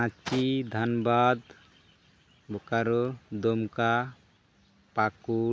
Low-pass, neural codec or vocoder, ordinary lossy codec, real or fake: none; none; none; real